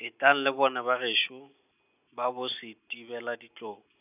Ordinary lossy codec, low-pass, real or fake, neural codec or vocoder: none; 3.6 kHz; real; none